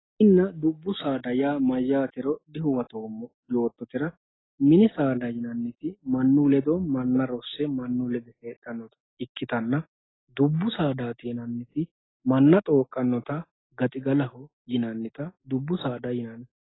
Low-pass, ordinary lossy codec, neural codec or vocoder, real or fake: 7.2 kHz; AAC, 16 kbps; none; real